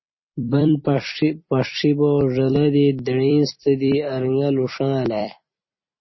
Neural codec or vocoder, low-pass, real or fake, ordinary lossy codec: vocoder, 44.1 kHz, 128 mel bands every 256 samples, BigVGAN v2; 7.2 kHz; fake; MP3, 24 kbps